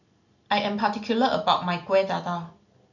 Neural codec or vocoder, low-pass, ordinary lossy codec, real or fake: none; 7.2 kHz; none; real